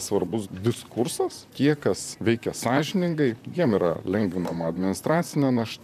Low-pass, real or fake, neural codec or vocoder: 14.4 kHz; fake; vocoder, 44.1 kHz, 128 mel bands, Pupu-Vocoder